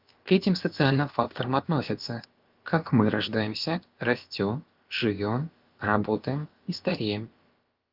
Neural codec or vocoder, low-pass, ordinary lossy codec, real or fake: codec, 16 kHz, about 1 kbps, DyCAST, with the encoder's durations; 5.4 kHz; Opus, 32 kbps; fake